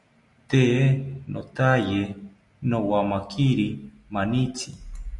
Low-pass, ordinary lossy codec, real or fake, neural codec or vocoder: 10.8 kHz; MP3, 96 kbps; real; none